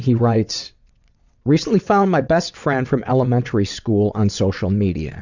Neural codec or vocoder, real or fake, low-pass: vocoder, 22.05 kHz, 80 mel bands, WaveNeXt; fake; 7.2 kHz